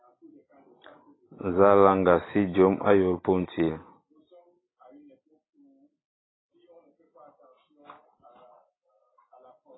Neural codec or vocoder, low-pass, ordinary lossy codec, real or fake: none; 7.2 kHz; AAC, 16 kbps; real